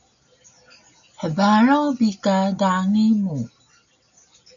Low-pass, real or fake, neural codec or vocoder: 7.2 kHz; real; none